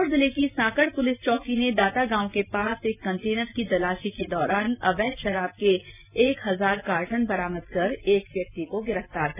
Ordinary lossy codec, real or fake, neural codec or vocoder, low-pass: none; real; none; 3.6 kHz